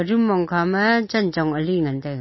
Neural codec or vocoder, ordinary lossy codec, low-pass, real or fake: none; MP3, 24 kbps; 7.2 kHz; real